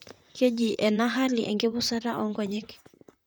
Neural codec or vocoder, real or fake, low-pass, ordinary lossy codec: vocoder, 44.1 kHz, 128 mel bands, Pupu-Vocoder; fake; none; none